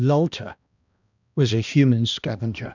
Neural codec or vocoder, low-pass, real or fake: codec, 16 kHz, 1 kbps, X-Codec, HuBERT features, trained on balanced general audio; 7.2 kHz; fake